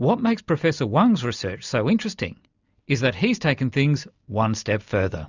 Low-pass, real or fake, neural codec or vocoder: 7.2 kHz; real; none